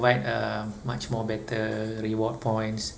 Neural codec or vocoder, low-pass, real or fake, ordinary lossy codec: none; none; real; none